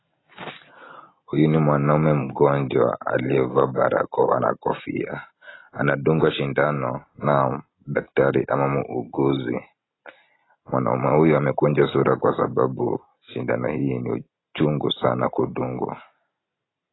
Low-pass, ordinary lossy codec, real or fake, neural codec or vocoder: 7.2 kHz; AAC, 16 kbps; real; none